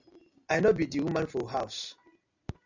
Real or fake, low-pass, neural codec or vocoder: real; 7.2 kHz; none